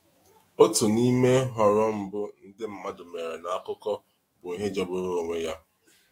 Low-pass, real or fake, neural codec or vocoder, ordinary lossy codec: 19.8 kHz; fake; autoencoder, 48 kHz, 128 numbers a frame, DAC-VAE, trained on Japanese speech; AAC, 48 kbps